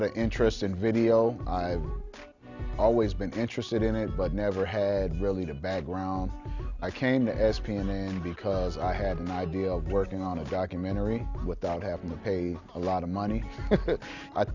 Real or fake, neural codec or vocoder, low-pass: real; none; 7.2 kHz